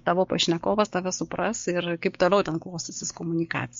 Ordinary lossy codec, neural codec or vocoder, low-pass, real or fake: MP3, 48 kbps; codec, 16 kHz, 6 kbps, DAC; 7.2 kHz; fake